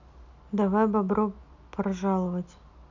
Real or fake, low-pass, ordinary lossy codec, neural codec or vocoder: real; 7.2 kHz; none; none